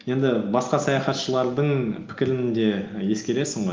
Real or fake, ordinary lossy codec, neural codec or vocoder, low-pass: real; Opus, 24 kbps; none; 7.2 kHz